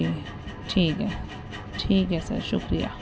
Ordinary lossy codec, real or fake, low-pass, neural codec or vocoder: none; real; none; none